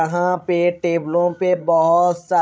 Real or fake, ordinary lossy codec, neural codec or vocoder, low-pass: fake; none; codec, 16 kHz, 16 kbps, FreqCodec, larger model; none